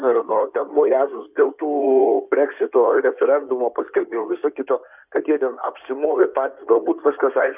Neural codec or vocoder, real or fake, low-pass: codec, 16 kHz in and 24 kHz out, 2.2 kbps, FireRedTTS-2 codec; fake; 3.6 kHz